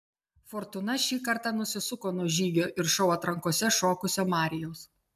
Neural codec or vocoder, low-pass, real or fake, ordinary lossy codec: none; 14.4 kHz; real; MP3, 96 kbps